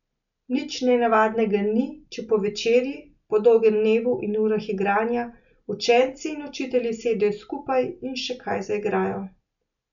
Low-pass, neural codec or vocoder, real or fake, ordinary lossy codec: 7.2 kHz; none; real; none